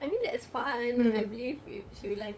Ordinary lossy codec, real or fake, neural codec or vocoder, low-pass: none; fake; codec, 16 kHz, 8 kbps, FunCodec, trained on LibriTTS, 25 frames a second; none